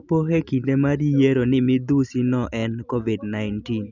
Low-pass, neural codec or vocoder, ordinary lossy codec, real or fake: 7.2 kHz; none; none; real